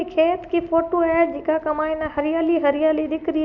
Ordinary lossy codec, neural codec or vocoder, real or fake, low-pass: none; none; real; 7.2 kHz